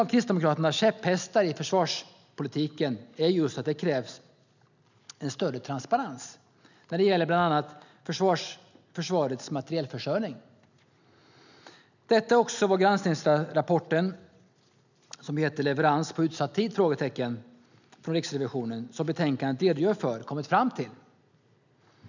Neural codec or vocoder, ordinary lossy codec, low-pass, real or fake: none; none; 7.2 kHz; real